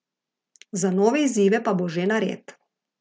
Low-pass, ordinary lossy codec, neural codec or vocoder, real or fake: none; none; none; real